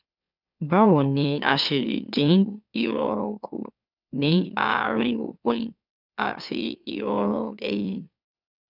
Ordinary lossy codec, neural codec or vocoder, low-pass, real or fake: none; autoencoder, 44.1 kHz, a latent of 192 numbers a frame, MeloTTS; 5.4 kHz; fake